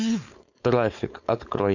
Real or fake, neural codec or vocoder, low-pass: fake; codec, 16 kHz, 4.8 kbps, FACodec; 7.2 kHz